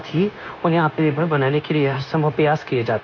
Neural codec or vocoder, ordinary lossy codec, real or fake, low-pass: codec, 16 kHz, 0.9 kbps, LongCat-Audio-Codec; none; fake; 7.2 kHz